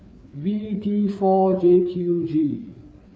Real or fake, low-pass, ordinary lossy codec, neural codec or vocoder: fake; none; none; codec, 16 kHz, 4 kbps, FreqCodec, larger model